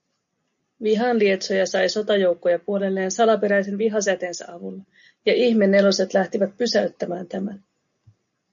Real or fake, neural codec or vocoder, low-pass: real; none; 7.2 kHz